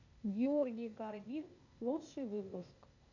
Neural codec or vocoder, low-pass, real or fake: codec, 16 kHz, 0.8 kbps, ZipCodec; 7.2 kHz; fake